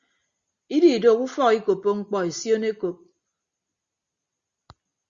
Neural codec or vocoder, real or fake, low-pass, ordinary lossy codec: none; real; 7.2 kHz; Opus, 64 kbps